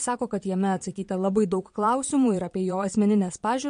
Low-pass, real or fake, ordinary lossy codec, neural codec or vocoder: 9.9 kHz; fake; MP3, 48 kbps; vocoder, 22.05 kHz, 80 mel bands, Vocos